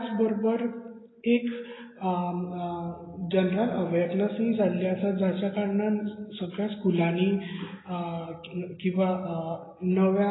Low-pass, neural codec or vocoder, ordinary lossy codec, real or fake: 7.2 kHz; none; AAC, 16 kbps; real